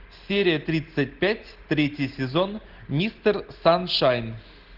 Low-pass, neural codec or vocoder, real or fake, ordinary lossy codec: 5.4 kHz; none; real; Opus, 16 kbps